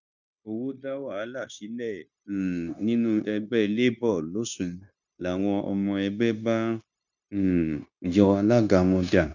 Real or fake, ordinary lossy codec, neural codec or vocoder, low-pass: fake; none; codec, 16 kHz, 0.9 kbps, LongCat-Audio-Codec; 7.2 kHz